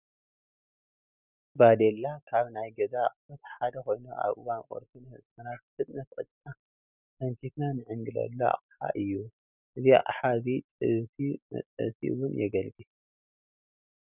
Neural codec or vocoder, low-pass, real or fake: none; 3.6 kHz; real